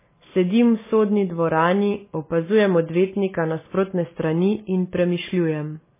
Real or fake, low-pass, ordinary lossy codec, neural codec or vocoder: real; 3.6 kHz; MP3, 16 kbps; none